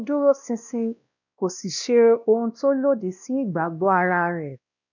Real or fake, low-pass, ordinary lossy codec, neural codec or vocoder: fake; 7.2 kHz; none; codec, 16 kHz, 1 kbps, X-Codec, WavLM features, trained on Multilingual LibriSpeech